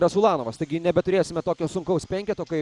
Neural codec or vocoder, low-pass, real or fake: none; 10.8 kHz; real